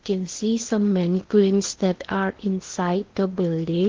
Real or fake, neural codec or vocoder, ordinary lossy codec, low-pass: fake; codec, 16 kHz in and 24 kHz out, 0.8 kbps, FocalCodec, streaming, 65536 codes; Opus, 16 kbps; 7.2 kHz